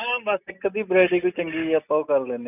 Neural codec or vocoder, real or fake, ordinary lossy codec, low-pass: none; real; none; 3.6 kHz